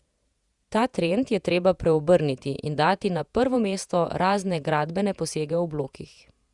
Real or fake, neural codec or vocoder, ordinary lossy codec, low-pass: fake; vocoder, 48 kHz, 128 mel bands, Vocos; Opus, 64 kbps; 10.8 kHz